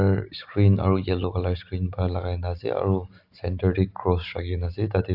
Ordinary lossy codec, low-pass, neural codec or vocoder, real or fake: AAC, 48 kbps; 5.4 kHz; none; real